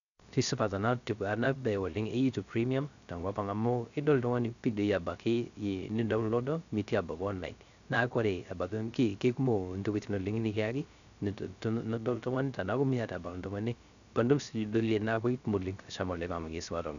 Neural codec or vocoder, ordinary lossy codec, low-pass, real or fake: codec, 16 kHz, 0.3 kbps, FocalCodec; none; 7.2 kHz; fake